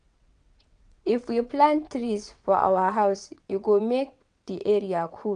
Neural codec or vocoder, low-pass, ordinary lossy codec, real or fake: vocoder, 22.05 kHz, 80 mel bands, WaveNeXt; 9.9 kHz; none; fake